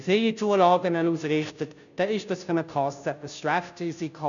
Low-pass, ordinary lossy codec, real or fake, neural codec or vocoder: 7.2 kHz; none; fake; codec, 16 kHz, 0.5 kbps, FunCodec, trained on Chinese and English, 25 frames a second